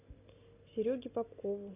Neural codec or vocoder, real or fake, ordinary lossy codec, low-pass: none; real; AAC, 32 kbps; 3.6 kHz